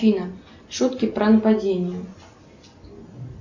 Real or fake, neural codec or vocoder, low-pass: real; none; 7.2 kHz